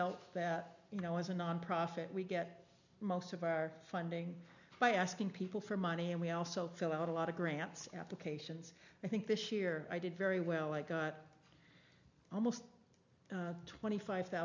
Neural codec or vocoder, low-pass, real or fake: none; 7.2 kHz; real